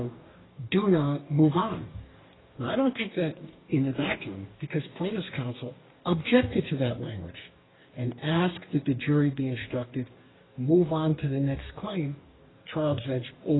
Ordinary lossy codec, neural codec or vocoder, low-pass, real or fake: AAC, 16 kbps; codec, 44.1 kHz, 2.6 kbps, DAC; 7.2 kHz; fake